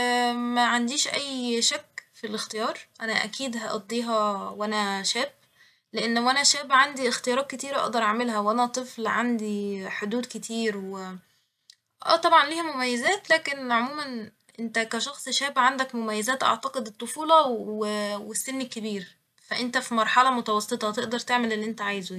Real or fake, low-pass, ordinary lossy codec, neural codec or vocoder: real; 14.4 kHz; none; none